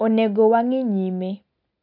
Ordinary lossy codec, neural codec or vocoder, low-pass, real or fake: none; none; 5.4 kHz; real